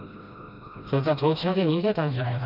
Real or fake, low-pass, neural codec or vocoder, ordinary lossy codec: fake; 5.4 kHz; codec, 16 kHz, 1 kbps, FreqCodec, smaller model; none